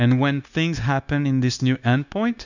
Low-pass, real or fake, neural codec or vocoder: 7.2 kHz; fake; codec, 16 kHz, 0.9 kbps, LongCat-Audio-Codec